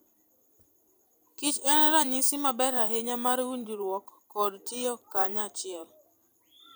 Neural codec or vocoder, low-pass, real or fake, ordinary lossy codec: vocoder, 44.1 kHz, 128 mel bands every 512 samples, BigVGAN v2; none; fake; none